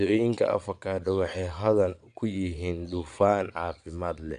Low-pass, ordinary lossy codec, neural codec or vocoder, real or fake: 9.9 kHz; none; vocoder, 22.05 kHz, 80 mel bands, Vocos; fake